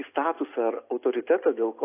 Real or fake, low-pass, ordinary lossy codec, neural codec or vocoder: real; 3.6 kHz; AAC, 24 kbps; none